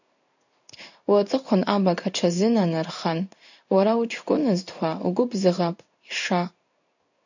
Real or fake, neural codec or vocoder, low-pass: fake; codec, 16 kHz in and 24 kHz out, 1 kbps, XY-Tokenizer; 7.2 kHz